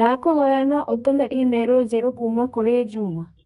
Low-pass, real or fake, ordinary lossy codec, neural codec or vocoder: 10.8 kHz; fake; none; codec, 24 kHz, 0.9 kbps, WavTokenizer, medium music audio release